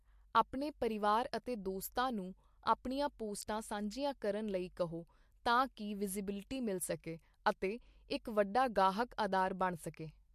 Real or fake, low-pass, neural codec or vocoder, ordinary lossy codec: real; 14.4 kHz; none; MP3, 64 kbps